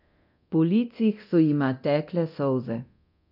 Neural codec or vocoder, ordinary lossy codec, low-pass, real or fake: codec, 24 kHz, 0.9 kbps, DualCodec; none; 5.4 kHz; fake